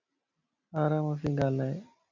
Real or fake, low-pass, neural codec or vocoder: real; 7.2 kHz; none